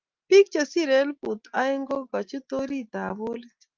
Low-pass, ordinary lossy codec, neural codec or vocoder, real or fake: 7.2 kHz; Opus, 24 kbps; none; real